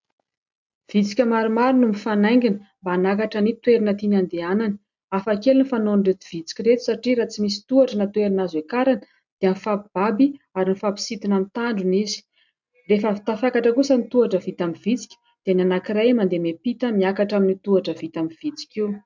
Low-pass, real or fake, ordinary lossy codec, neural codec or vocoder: 7.2 kHz; real; MP3, 64 kbps; none